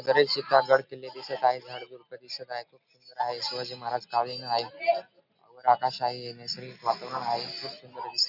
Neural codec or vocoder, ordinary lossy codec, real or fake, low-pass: none; none; real; 5.4 kHz